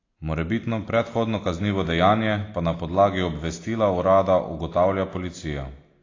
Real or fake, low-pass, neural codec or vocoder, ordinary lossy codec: real; 7.2 kHz; none; AAC, 32 kbps